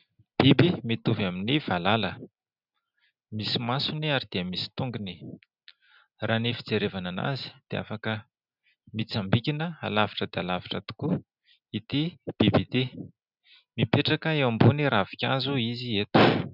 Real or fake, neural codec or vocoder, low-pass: real; none; 5.4 kHz